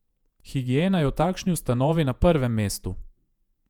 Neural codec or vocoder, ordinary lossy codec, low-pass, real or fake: vocoder, 48 kHz, 128 mel bands, Vocos; none; 19.8 kHz; fake